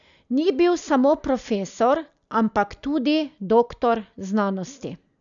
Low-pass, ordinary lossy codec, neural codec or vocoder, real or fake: 7.2 kHz; none; none; real